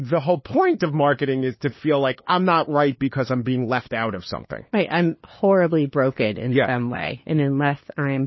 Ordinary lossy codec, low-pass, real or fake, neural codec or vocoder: MP3, 24 kbps; 7.2 kHz; fake; codec, 16 kHz, 2 kbps, FunCodec, trained on LibriTTS, 25 frames a second